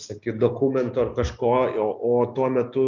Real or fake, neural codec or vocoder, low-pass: real; none; 7.2 kHz